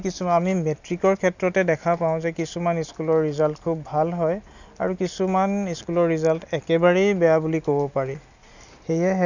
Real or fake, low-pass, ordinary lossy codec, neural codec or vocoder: real; 7.2 kHz; none; none